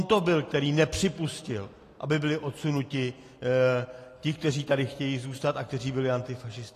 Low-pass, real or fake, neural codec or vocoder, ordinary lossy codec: 14.4 kHz; real; none; AAC, 48 kbps